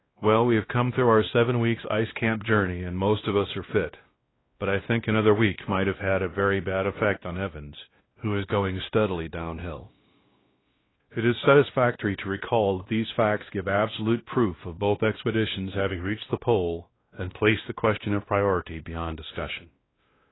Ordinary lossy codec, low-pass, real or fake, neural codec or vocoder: AAC, 16 kbps; 7.2 kHz; fake; codec, 16 kHz, 1 kbps, X-Codec, WavLM features, trained on Multilingual LibriSpeech